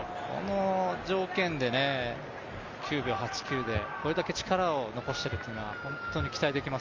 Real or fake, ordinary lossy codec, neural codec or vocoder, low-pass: real; Opus, 32 kbps; none; 7.2 kHz